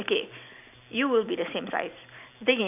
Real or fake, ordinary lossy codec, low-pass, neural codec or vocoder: real; none; 3.6 kHz; none